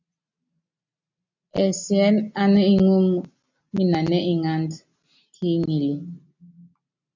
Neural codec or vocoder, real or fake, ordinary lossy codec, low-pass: none; real; MP3, 64 kbps; 7.2 kHz